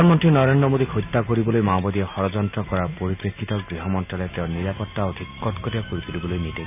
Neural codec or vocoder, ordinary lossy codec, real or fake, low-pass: none; none; real; 3.6 kHz